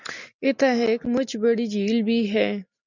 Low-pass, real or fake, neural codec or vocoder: 7.2 kHz; real; none